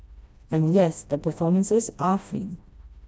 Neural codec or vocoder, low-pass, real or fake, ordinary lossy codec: codec, 16 kHz, 1 kbps, FreqCodec, smaller model; none; fake; none